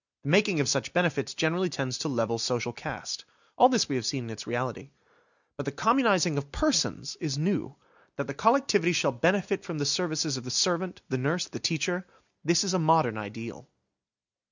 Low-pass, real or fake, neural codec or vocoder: 7.2 kHz; real; none